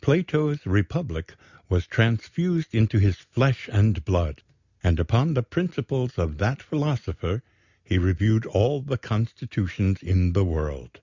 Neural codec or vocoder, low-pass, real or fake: none; 7.2 kHz; real